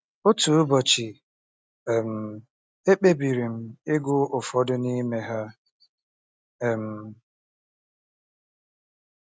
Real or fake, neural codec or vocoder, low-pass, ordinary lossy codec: real; none; none; none